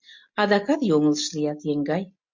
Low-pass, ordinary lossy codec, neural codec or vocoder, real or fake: 7.2 kHz; MP3, 48 kbps; none; real